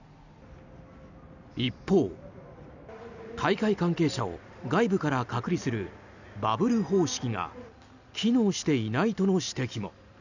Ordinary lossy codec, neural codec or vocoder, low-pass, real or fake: none; none; 7.2 kHz; real